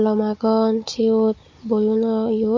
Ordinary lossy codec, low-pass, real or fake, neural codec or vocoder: MP3, 32 kbps; 7.2 kHz; fake; codec, 16 kHz, 16 kbps, FunCodec, trained on Chinese and English, 50 frames a second